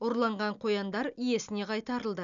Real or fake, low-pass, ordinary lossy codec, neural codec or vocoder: real; 7.2 kHz; none; none